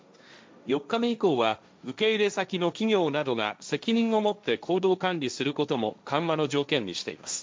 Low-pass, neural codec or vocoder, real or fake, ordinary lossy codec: none; codec, 16 kHz, 1.1 kbps, Voila-Tokenizer; fake; none